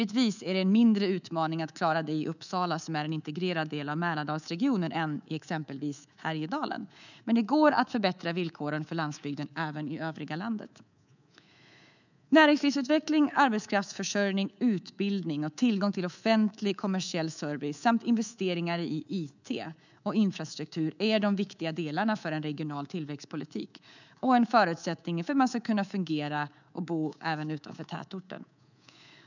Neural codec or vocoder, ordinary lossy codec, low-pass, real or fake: codec, 24 kHz, 3.1 kbps, DualCodec; none; 7.2 kHz; fake